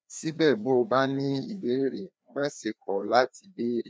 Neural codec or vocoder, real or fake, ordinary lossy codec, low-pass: codec, 16 kHz, 2 kbps, FreqCodec, larger model; fake; none; none